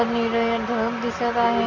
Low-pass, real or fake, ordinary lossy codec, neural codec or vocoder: 7.2 kHz; real; none; none